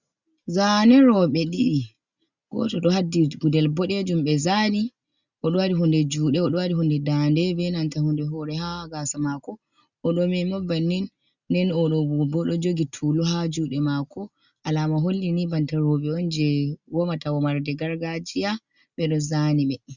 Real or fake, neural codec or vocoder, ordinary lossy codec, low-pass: real; none; Opus, 64 kbps; 7.2 kHz